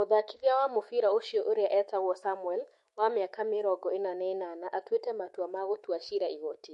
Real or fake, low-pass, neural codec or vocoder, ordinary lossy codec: fake; 14.4 kHz; autoencoder, 48 kHz, 128 numbers a frame, DAC-VAE, trained on Japanese speech; MP3, 48 kbps